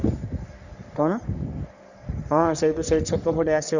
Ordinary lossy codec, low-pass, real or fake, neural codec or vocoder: none; 7.2 kHz; fake; codec, 44.1 kHz, 3.4 kbps, Pupu-Codec